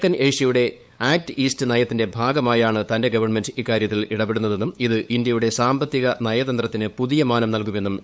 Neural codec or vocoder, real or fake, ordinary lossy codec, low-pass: codec, 16 kHz, 8 kbps, FunCodec, trained on LibriTTS, 25 frames a second; fake; none; none